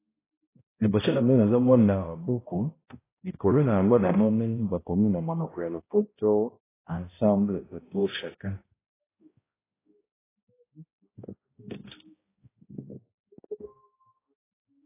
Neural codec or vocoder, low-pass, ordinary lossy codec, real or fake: codec, 16 kHz, 0.5 kbps, X-Codec, HuBERT features, trained on balanced general audio; 3.6 kHz; AAC, 16 kbps; fake